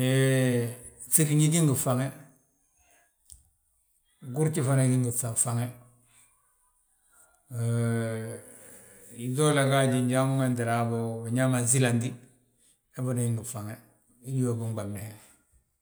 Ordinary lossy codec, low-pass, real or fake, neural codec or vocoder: none; none; real; none